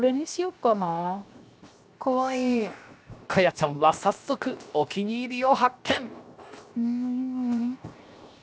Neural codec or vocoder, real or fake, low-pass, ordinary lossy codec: codec, 16 kHz, 0.7 kbps, FocalCodec; fake; none; none